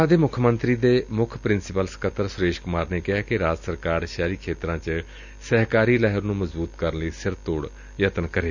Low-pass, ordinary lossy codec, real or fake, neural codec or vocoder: 7.2 kHz; none; real; none